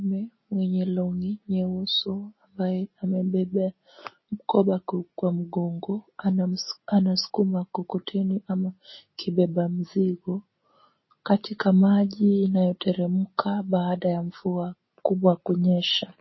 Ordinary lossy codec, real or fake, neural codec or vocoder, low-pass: MP3, 24 kbps; real; none; 7.2 kHz